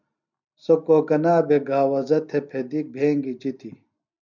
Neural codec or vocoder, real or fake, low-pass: none; real; 7.2 kHz